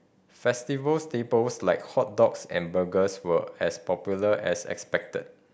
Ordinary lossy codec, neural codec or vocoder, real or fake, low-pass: none; none; real; none